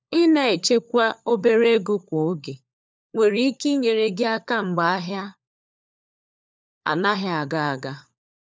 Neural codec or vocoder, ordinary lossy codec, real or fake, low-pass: codec, 16 kHz, 4 kbps, FunCodec, trained on LibriTTS, 50 frames a second; none; fake; none